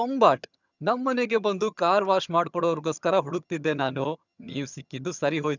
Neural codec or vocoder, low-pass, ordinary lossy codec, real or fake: vocoder, 22.05 kHz, 80 mel bands, HiFi-GAN; 7.2 kHz; none; fake